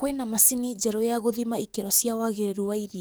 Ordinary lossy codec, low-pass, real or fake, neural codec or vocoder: none; none; fake; codec, 44.1 kHz, 7.8 kbps, DAC